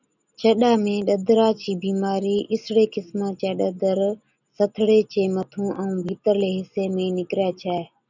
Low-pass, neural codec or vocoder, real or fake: 7.2 kHz; none; real